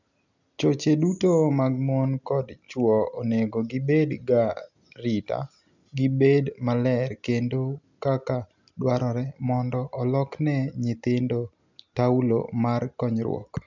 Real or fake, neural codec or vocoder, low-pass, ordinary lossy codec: real; none; 7.2 kHz; none